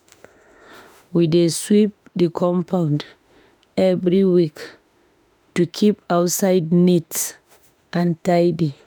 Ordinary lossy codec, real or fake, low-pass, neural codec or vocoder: none; fake; none; autoencoder, 48 kHz, 32 numbers a frame, DAC-VAE, trained on Japanese speech